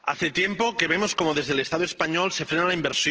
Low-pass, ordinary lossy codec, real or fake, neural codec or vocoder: 7.2 kHz; Opus, 16 kbps; real; none